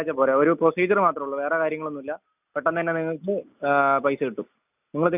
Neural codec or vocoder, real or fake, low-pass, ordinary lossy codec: none; real; 3.6 kHz; none